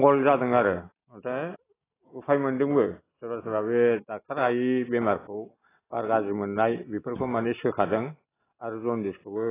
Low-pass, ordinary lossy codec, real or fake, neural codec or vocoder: 3.6 kHz; AAC, 16 kbps; real; none